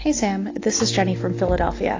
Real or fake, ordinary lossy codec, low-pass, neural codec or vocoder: real; AAC, 32 kbps; 7.2 kHz; none